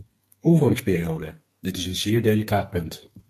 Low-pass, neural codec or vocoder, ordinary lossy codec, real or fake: 14.4 kHz; codec, 32 kHz, 1.9 kbps, SNAC; MP3, 64 kbps; fake